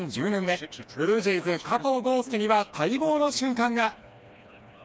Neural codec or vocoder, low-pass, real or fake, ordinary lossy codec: codec, 16 kHz, 2 kbps, FreqCodec, smaller model; none; fake; none